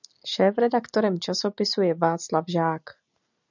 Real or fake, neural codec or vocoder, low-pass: real; none; 7.2 kHz